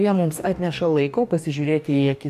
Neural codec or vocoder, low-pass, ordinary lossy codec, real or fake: codec, 44.1 kHz, 2.6 kbps, DAC; 14.4 kHz; AAC, 96 kbps; fake